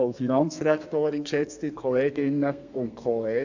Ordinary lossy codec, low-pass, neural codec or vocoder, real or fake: none; 7.2 kHz; codec, 32 kHz, 1.9 kbps, SNAC; fake